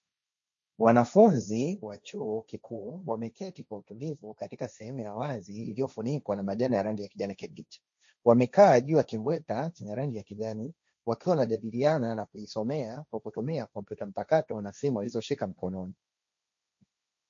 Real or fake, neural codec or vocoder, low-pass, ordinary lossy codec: fake; codec, 16 kHz, 1.1 kbps, Voila-Tokenizer; 7.2 kHz; MP3, 48 kbps